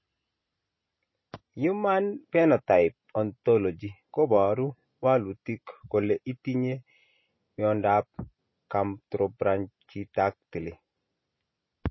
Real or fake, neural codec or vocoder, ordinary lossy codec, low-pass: real; none; MP3, 24 kbps; 7.2 kHz